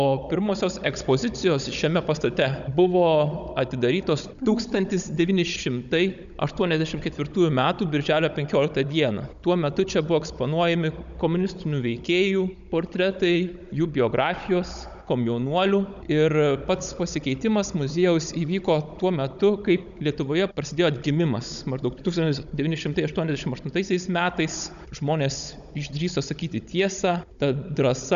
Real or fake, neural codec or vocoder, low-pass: fake; codec, 16 kHz, 16 kbps, FunCodec, trained on Chinese and English, 50 frames a second; 7.2 kHz